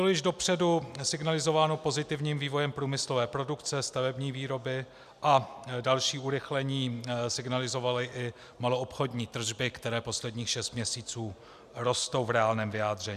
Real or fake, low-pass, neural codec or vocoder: real; 14.4 kHz; none